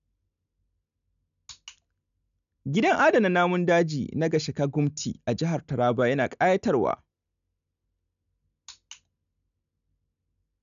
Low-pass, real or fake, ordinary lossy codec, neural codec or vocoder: 7.2 kHz; real; none; none